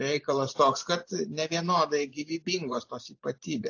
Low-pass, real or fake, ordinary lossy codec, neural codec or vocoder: 7.2 kHz; fake; AAC, 48 kbps; vocoder, 44.1 kHz, 128 mel bands every 512 samples, BigVGAN v2